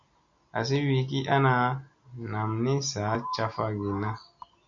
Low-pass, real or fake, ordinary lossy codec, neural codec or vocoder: 7.2 kHz; real; MP3, 64 kbps; none